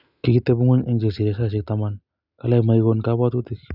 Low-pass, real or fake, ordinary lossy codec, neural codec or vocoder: 5.4 kHz; real; none; none